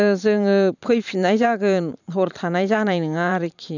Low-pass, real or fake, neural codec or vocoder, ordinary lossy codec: 7.2 kHz; fake; vocoder, 44.1 kHz, 128 mel bands every 512 samples, BigVGAN v2; none